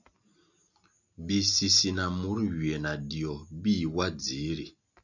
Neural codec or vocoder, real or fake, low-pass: none; real; 7.2 kHz